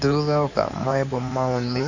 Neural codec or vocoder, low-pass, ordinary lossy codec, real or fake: codec, 16 kHz in and 24 kHz out, 2.2 kbps, FireRedTTS-2 codec; 7.2 kHz; none; fake